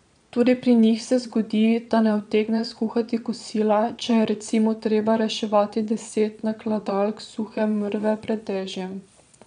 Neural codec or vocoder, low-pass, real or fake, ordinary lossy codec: vocoder, 22.05 kHz, 80 mel bands, Vocos; 9.9 kHz; fake; none